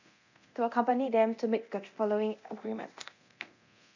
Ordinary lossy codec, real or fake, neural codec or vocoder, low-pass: none; fake; codec, 24 kHz, 0.9 kbps, DualCodec; 7.2 kHz